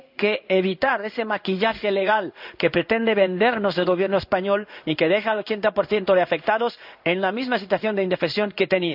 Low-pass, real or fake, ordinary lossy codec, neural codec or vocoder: 5.4 kHz; fake; none; codec, 16 kHz in and 24 kHz out, 1 kbps, XY-Tokenizer